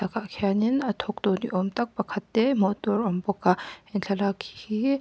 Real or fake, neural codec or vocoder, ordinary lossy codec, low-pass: real; none; none; none